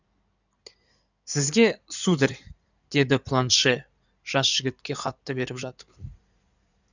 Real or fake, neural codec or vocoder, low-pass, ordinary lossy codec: fake; codec, 16 kHz in and 24 kHz out, 2.2 kbps, FireRedTTS-2 codec; 7.2 kHz; none